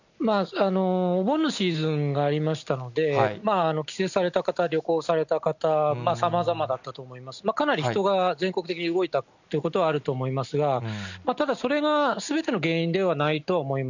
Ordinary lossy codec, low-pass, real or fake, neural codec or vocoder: none; 7.2 kHz; real; none